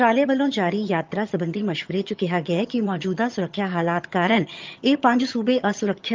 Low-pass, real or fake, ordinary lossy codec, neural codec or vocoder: 7.2 kHz; fake; Opus, 24 kbps; vocoder, 22.05 kHz, 80 mel bands, HiFi-GAN